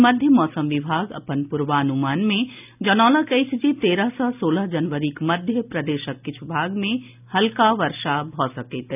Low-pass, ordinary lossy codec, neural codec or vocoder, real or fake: 3.6 kHz; none; none; real